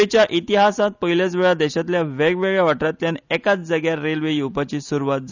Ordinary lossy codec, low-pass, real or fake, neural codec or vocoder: none; 7.2 kHz; real; none